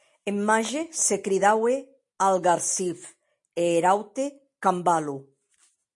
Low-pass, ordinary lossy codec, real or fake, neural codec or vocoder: 10.8 kHz; MP3, 48 kbps; real; none